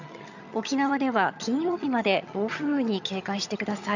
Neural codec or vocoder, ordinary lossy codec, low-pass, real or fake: vocoder, 22.05 kHz, 80 mel bands, HiFi-GAN; none; 7.2 kHz; fake